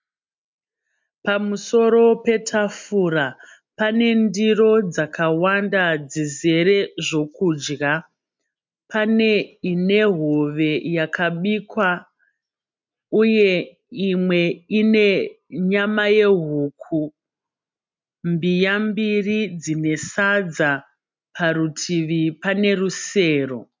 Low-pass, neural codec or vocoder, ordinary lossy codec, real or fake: 7.2 kHz; none; MP3, 64 kbps; real